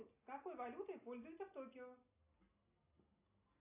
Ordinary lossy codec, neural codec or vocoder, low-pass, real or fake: AAC, 32 kbps; none; 3.6 kHz; real